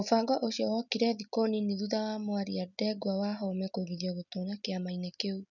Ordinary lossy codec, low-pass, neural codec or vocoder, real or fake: none; 7.2 kHz; none; real